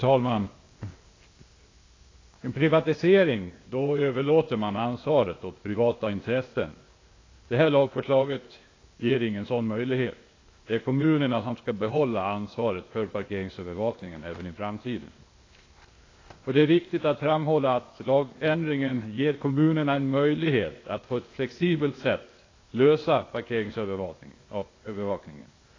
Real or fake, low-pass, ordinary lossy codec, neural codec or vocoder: fake; 7.2 kHz; AAC, 32 kbps; codec, 16 kHz, 0.8 kbps, ZipCodec